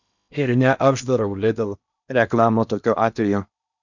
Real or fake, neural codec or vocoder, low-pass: fake; codec, 16 kHz in and 24 kHz out, 0.8 kbps, FocalCodec, streaming, 65536 codes; 7.2 kHz